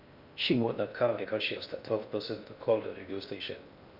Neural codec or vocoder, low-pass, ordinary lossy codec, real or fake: codec, 16 kHz in and 24 kHz out, 0.6 kbps, FocalCodec, streaming, 4096 codes; 5.4 kHz; none; fake